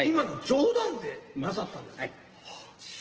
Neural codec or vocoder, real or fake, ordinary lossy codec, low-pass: none; real; Opus, 16 kbps; 7.2 kHz